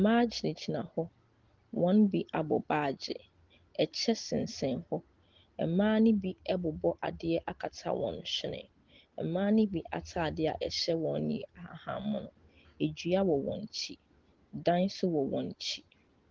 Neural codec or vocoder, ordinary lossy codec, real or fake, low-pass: none; Opus, 16 kbps; real; 7.2 kHz